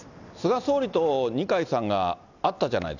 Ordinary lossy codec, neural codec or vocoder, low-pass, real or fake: none; none; 7.2 kHz; real